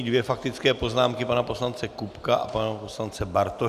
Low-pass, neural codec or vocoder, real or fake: 14.4 kHz; none; real